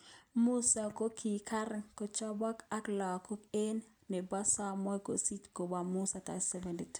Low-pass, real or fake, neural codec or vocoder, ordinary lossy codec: none; real; none; none